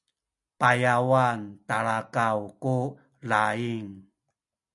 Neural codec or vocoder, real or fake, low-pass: none; real; 10.8 kHz